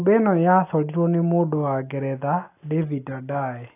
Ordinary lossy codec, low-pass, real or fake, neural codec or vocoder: AAC, 24 kbps; 3.6 kHz; real; none